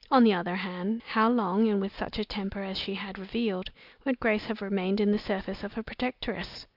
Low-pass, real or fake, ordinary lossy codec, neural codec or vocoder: 5.4 kHz; real; Opus, 24 kbps; none